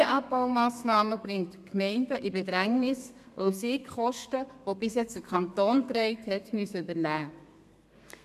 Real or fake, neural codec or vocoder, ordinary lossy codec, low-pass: fake; codec, 32 kHz, 1.9 kbps, SNAC; AAC, 96 kbps; 14.4 kHz